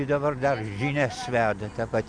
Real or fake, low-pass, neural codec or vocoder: real; 9.9 kHz; none